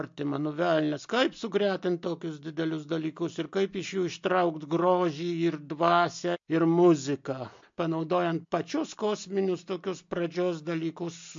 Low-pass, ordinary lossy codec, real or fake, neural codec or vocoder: 7.2 kHz; MP3, 48 kbps; real; none